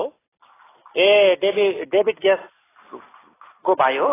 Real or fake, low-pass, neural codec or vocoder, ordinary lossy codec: real; 3.6 kHz; none; AAC, 16 kbps